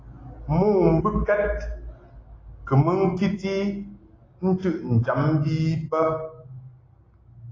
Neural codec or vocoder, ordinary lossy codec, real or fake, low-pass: none; AAC, 32 kbps; real; 7.2 kHz